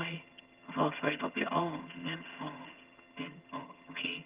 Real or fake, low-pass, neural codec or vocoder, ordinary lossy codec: fake; 3.6 kHz; vocoder, 22.05 kHz, 80 mel bands, HiFi-GAN; Opus, 32 kbps